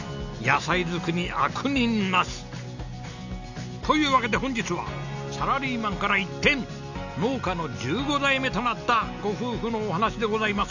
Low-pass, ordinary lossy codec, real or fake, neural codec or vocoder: 7.2 kHz; none; real; none